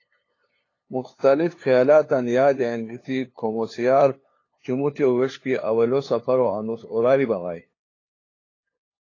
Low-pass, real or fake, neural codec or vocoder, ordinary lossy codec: 7.2 kHz; fake; codec, 16 kHz, 2 kbps, FunCodec, trained on LibriTTS, 25 frames a second; AAC, 32 kbps